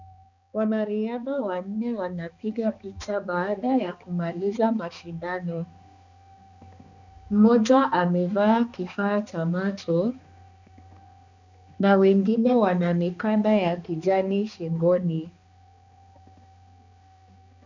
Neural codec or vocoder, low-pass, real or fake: codec, 16 kHz, 2 kbps, X-Codec, HuBERT features, trained on balanced general audio; 7.2 kHz; fake